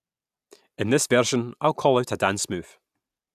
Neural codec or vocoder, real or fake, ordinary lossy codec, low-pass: none; real; none; 14.4 kHz